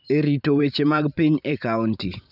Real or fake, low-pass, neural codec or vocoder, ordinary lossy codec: fake; 5.4 kHz; vocoder, 44.1 kHz, 128 mel bands every 512 samples, BigVGAN v2; none